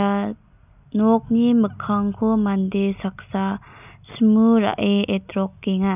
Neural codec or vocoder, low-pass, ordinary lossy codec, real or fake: none; 3.6 kHz; none; real